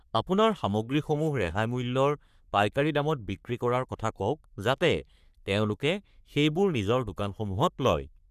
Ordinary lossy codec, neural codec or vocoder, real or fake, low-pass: none; codec, 44.1 kHz, 3.4 kbps, Pupu-Codec; fake; 14.4 kHz